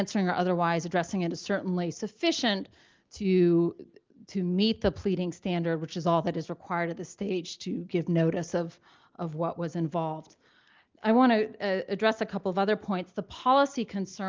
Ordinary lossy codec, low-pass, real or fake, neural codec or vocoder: Opus, 32 kbps; 7.2 kHz; real; none